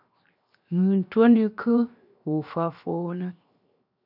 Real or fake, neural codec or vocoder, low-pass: fake; codec, 16 kHz, 0.7 kbps, FocalCodec; 5.4 kHz